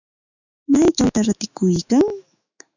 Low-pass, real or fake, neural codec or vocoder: 7.2 kHz; fake; autoencoder, 48 kHz, 128 numbers a frame, DAC-VAE, trained on Japanese speech